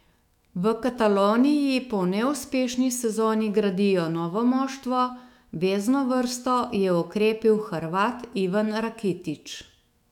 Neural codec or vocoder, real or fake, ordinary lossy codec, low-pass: autoencoder, 48 kHz, 128 numbers a frame, DAC-VAE, trained on Japanese speech; fake; none; 19.8 kHz